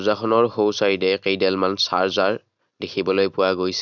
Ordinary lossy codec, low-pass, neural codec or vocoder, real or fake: none; 7.2 kHz; none; real